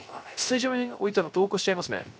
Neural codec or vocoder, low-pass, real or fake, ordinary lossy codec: codec, 16 kHz, 0.3 kbps, FocalCodec; none; fake; none